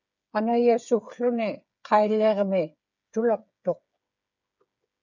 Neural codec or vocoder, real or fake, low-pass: codec, 16 kHz, 8 kbps, FreqCodec, smaller model; fake; 7.2 kHz